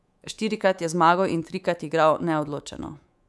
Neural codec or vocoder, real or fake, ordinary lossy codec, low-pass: codec, 24 kHz, 3.1 kbps, DualCodec; fake; none; none